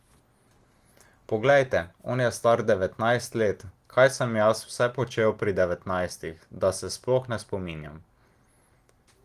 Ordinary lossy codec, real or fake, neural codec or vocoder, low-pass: Opus, 24 kbps; real; none; 14.4 kHz